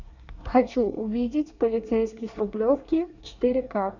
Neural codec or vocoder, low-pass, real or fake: codec, 24 kHz, 1 kbps, SNAC; 7.2 kHz; fake